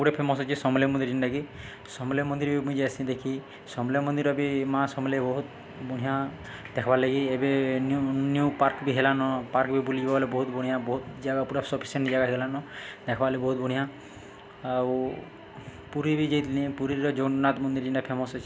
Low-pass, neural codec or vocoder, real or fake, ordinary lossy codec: none; none; real; none